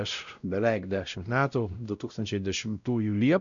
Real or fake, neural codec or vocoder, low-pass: fake; codec, 16 kHz, 0.5 kbps, X-Codec, WavLM features, trained on Multilingual LibriSpeech; 7.2 kHz